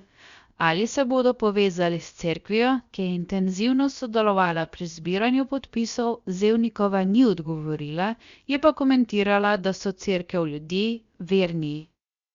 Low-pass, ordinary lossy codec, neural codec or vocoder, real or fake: 7.2 kHz; Opus, 64 kbps; codec, 16 kHz, about 1 kbps, DyCAST, with the encoder's durations; fake